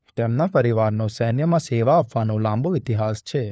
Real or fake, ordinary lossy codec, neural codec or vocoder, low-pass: fake; none; codec, 16 kHz, 4 kbps, FunCodec, trained on LibriTTS, 50 frames a second; none